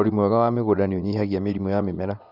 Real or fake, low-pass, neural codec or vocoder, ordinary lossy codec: fake; 5.4 kHz; vocoder, 24 kHz, 100 mel bands, Vocos; none